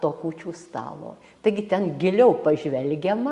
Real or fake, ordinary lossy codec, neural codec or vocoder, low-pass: real; Opus, 64 kbps; none; 10.8 kHz